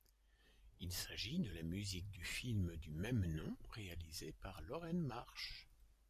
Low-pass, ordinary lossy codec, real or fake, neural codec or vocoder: 14.4 kHz; MP3, 96 kbps; real; none